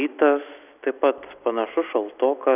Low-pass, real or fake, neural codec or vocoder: 3.6 kHz; real; none